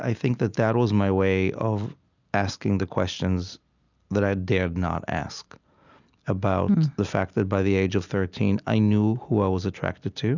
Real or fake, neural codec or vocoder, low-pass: real; none; 7.2 kHz